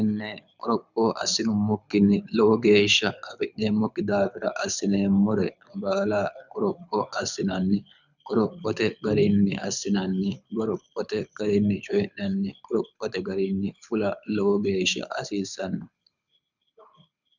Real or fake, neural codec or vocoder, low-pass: fake; codec, 24 kHz, 6 kbps, HILCodec; 7.2 kHz